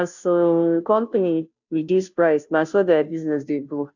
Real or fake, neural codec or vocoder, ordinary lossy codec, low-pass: fake; codec, 16 kHz, 0.5 kbps, FunCodec, trained on Chinese and English, 25 frames a second; none; 7.2 kHz